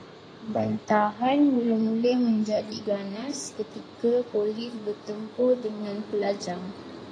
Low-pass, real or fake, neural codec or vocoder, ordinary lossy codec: 9.9 kHz; fake; codec, 16 kHz in and 24 kHz out, 2.2 kbps, FireRedTTS-2 codec; AAC, 32 kbps